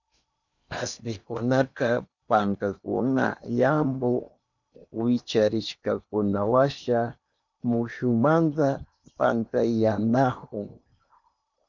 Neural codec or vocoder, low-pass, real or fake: codec, 16 kHz in and 24 kHz out, 0.8 kbps, FocalCodec, streaming, 65536 codes; 7.2 kHz; fake